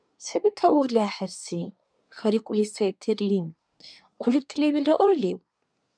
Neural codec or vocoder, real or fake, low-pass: codec, 24 kHz, 1 kbps, SNAC; fake; 9.9 kHz